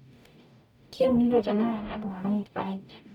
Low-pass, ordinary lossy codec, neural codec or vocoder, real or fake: 19.8 kHz; none; codec, 44.1 kHz, 0.9 kbps, DAC; fake